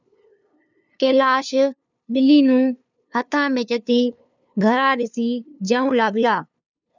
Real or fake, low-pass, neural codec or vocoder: fake; 7.2 kHz; codec, 16 kHz, 2 kbps, FunCodec, trained on LibriTTS, 25 frames a second